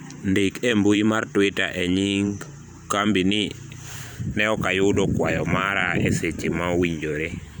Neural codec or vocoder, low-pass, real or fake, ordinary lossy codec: vocoder, 44.1 kHz, 128 mel bands every 512 samples, BigVGAN v2; none; fake; none